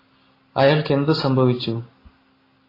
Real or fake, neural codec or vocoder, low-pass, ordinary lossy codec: real; none; 5.4 kHz; AAC, 24 kbps